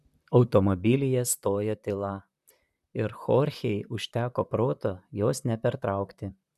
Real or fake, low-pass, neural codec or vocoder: fake; 14.4 kHz; vocoder, 44.1 kHz, 128 mel bands, Pupu-Vocoder